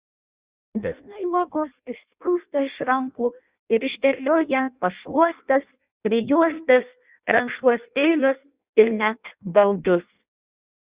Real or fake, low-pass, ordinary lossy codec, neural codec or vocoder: fake; 3.6 kHz; Opus, 64 kbps; codec, 16 kHz in and 24 kHz out, 0.6 kbps, FireRedTTS-2 codec